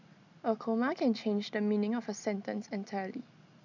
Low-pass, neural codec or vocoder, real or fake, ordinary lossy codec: 7.2 kHz; none; real; none